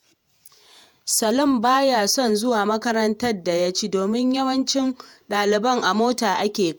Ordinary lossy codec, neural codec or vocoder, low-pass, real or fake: none; vocoder, 48 kHz, 128 mel bands, Vocos; none; fake